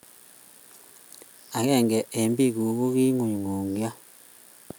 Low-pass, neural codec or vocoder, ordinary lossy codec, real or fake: none; none; none; real